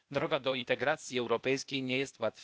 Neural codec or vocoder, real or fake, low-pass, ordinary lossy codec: codec, 16 kHz, 0.8 kbps, ZipCodec; fake; none; none